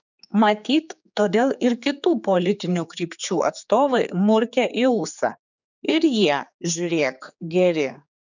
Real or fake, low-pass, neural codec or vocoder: fake; 7.2 kHz; codec, 16 kHz, 4 kbps, X-Codec, HuBERT features, trained on general audio